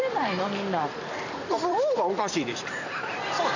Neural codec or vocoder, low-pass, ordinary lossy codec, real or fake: vocoder, 44.1 kHz, 128 mel bands every 256 samples, BigVGAN v2; 7.2 kHz; none; fake